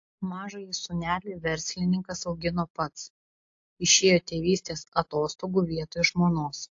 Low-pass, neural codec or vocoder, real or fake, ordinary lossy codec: 7.2 kHz; none; real; AAC, 48 kbps